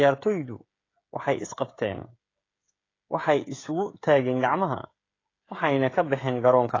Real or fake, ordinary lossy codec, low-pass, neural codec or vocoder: fake; AAC, 32 kbps; 7.2 kHz; codec, 16 kHz, 16 kbps, FreqCodec, smaller model